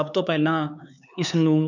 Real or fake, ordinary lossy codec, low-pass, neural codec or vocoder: fake; none; 7.2 kHz; codec, 16 kHz, 4 kbps, X-Codec, HuBERT features, trained on LibriSpeech